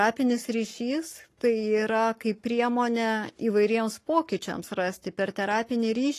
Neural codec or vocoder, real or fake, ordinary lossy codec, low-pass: codec, 44.1 kHz, 7.8 kbps, Pupu-Codec; fake; AAC, 48 kbps; 14.4 kHz